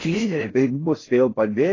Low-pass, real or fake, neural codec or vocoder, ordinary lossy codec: 7.2 kHz; fake; codec, 16 kHz in and 24 kHz out, 0.6 kbps, FocalCodec, streaming, 4096 codes; AAC, 32 kbps